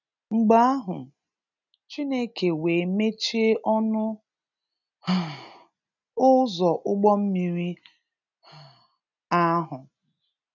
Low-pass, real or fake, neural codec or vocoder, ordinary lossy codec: 7.2 kHz; real; none; none